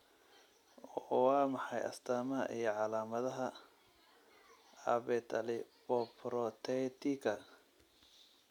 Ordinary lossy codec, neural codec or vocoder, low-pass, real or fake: none; none; 19.8 kHz; real